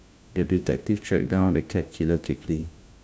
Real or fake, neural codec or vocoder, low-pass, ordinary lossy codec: fake; codec, 16 kHz, 1 kbps, FunCodec, trained on LibriTTS, 50 frames a second; none; none